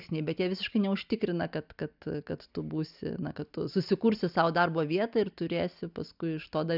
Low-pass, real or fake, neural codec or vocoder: 5.4 kHz; real; none